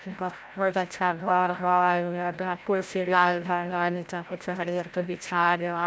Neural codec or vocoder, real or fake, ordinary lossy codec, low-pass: codec, 16 kHz, 0.5 kbps, FreqCodec, larger model; fake; none; none